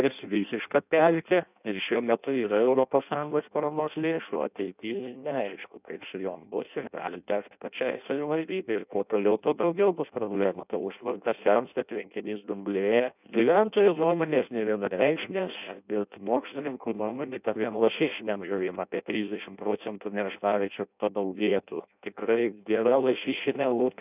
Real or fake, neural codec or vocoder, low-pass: fake; codec, 16 kHz in and 24 kHz out, 0.6 kbps, FireRedTTS-2 codec; 3.6 kHz